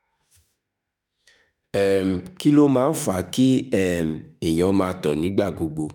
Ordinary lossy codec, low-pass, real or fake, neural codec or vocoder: none; 19.8 kHz; fake; autoencoder, 48 kHz, 32 numbers a frame, DAC-VAE, trained on Japanese speech